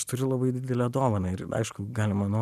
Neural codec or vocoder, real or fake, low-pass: none; real; 14.4 kHz